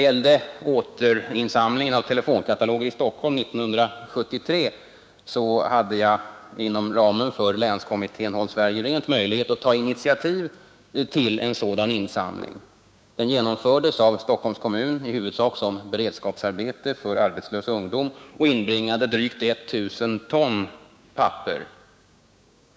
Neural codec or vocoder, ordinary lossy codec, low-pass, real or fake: codec, 16 kHz, 6 kbps, DAC; none; none; fake